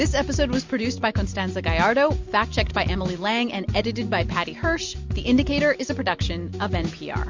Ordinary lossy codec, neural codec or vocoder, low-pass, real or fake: MP3, 48 kbps; none; 7.2 kHz; real